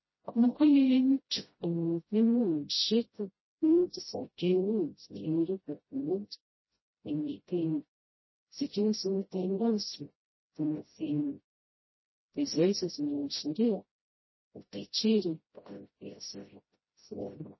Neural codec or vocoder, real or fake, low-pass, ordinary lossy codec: codec, 16 kHz, 0.5 kbps, FreqCodec, smaller model; fake; 7.2 kHz; MP3, 24 kbps